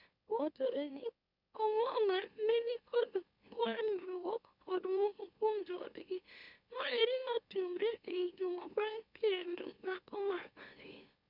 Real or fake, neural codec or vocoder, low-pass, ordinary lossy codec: fake; autoencoder, 44.1 kHz, a latent of 192 numbers a frame, MeloTTS; 5.4 kHz; none